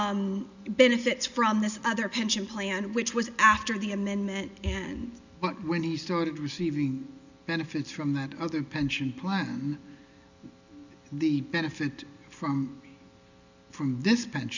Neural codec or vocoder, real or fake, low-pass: none; real; 7.2 kHz